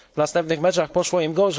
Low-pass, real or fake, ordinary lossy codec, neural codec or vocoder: none; fake; none; codec, 16 kHz, 4.8 kbps, FACodec